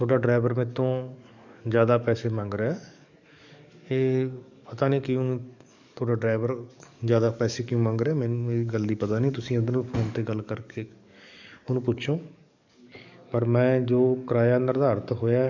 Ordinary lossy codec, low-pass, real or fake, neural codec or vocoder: none; 7.2 kHz; fake; codec, 44.1 kHz, 7.8 kbps, DAC